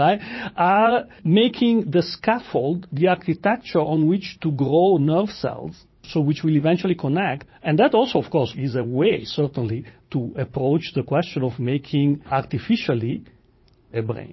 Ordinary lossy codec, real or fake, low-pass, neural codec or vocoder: MP3, 24 kbps; fake; 7.2 kHz; vocoder, 44.1 kHz, 80 mel bands, Vocos